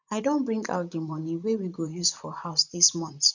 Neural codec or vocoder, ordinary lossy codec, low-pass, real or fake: vocoder, 44.1 kHz, 128 mel bands, Pupu-Vocoder; none; 7.2 kHz; fake